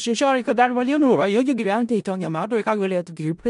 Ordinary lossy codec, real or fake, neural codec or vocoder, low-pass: MP3, 64 kbps; fake; codec, 16 kHz in and 24 kHz out, 0.4 kbps, LongCat-Audio-Codec, four codebook decoder; 10.8 kHz